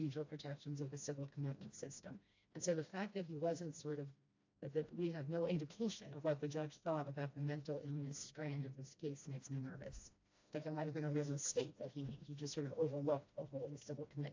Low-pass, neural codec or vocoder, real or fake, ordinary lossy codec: 7.2 kHz; codec, 16 kHz, 1 kbps, FreqCodec, smaller model; fake; AAC, 48 kbps